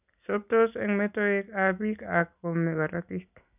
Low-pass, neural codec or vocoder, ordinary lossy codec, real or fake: 3.6 kHz; none; none; real